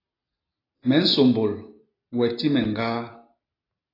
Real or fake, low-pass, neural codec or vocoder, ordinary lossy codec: real; 5.4 kHz; none; AAC, 24 kbps